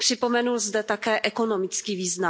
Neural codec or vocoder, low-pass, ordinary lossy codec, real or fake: none; none; none; real